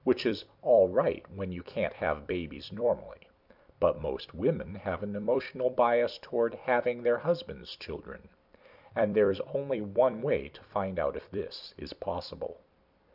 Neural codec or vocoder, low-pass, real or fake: vocoder, 44.1 kHz, 128 mel bands every 256 samples, BigVGAN v2; 5.4 kHz; fake